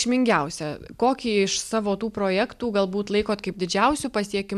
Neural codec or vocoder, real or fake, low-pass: none; real; 14.4 kHz